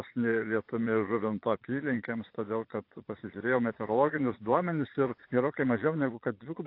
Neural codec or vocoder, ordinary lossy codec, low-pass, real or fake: vocoder, 22.05 kHz, 80 mel bands, Vocos; AAC, 32 kbps; 5.4 kHz; fake